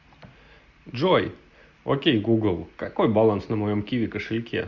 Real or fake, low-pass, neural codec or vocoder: real; 7.2 kHz; none